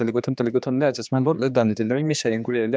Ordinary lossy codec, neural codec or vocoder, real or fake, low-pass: none; codec, 16 kHz, 2 kbps, X-Codec, HuBERT features, trained on general audio; fake; none